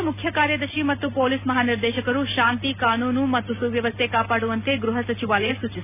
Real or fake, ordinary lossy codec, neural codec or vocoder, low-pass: real; none; none; 3.6 kHz